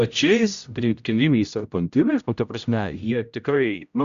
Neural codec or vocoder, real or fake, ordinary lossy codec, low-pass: codec, 16 kHz, 0.5 kbps, X-Codec, HuBERT features, trained on general audio; fake; Opus, 64 kbps; 7.2 kHz